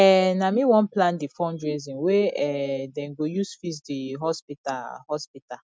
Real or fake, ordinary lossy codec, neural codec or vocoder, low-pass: real; none; none; none